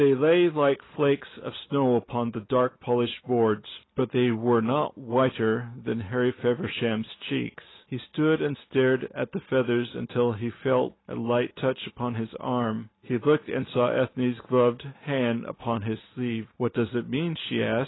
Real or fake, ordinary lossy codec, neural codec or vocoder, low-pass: real; AAC, 16 kbps; none; 7.2 kHz